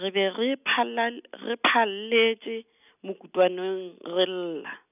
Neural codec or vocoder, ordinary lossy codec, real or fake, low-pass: none; none; real; 3.6 kHz